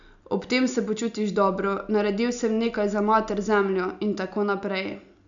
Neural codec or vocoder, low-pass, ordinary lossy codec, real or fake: none; 7.2 kHz; none; real